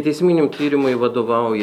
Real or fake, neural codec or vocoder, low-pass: real; none; 19.8 kHz